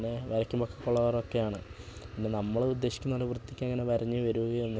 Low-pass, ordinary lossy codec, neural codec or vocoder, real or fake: none; none; none; real